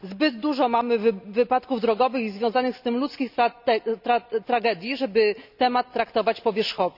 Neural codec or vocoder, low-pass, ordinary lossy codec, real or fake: none; 5.4 kHz; none; real